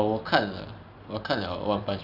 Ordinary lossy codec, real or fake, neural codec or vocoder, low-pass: none; real; none; 5.4 kHz